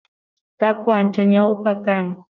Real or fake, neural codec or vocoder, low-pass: fake; codec, 24 kHz, 1 kbps, SNAC; 7.2 kHz